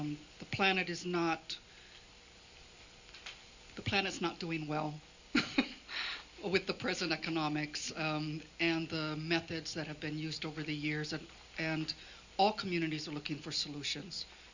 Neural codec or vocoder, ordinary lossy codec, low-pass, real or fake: none; Opus, 64 kbps; 7.2 kHz; real